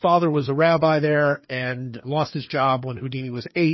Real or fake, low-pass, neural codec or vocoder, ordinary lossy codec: fake; 7.2 kHz; codec, 16 kHz, 2 kbps, FreqCodec, larger model; MP3, 24 kbps